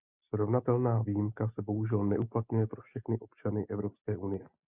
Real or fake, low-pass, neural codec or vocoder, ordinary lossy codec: real; 3.6 kHz; none; Opus, 64 kbps